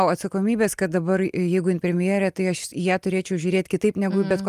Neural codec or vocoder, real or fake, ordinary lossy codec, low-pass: none; real; Opus, 32 kbps; 14.4 kHz